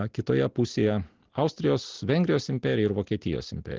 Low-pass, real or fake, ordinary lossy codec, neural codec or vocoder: 7.2 kHz; real; Opus, 16 kbps; none